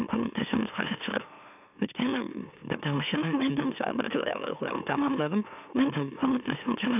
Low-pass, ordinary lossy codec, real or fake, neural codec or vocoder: 3.6 kHz; none; fake; autoencoder, 44.1 kHz, a latent of 192 numbers a frame, MeloTTS